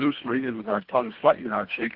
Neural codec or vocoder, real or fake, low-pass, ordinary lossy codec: codec, 24 kHz, 1.5 kbps, HILCodec; fake; 5.4 kHz; Opus, 16 kbps